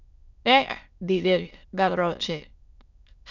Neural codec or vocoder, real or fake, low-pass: autoencoder, 22.05 kHz, a latent of 192 numbers a frame, VITS, trained on many speakers; fake; 7.2 kHz